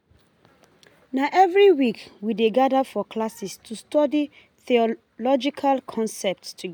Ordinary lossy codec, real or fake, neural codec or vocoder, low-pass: none; real; none; none